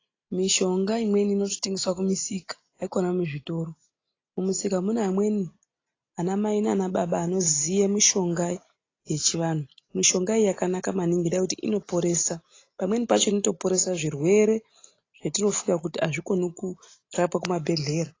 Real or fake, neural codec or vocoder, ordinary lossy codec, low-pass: real; none; AAC, 32 kbps; 7.2 kHz